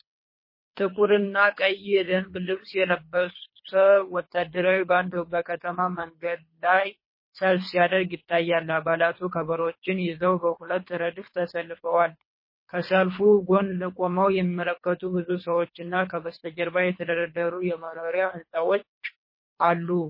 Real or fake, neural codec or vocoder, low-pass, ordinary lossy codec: fake; codec, 24 kHz, 3 kbps, HILCodec; 5.4 kHz; MP3, 24 kbps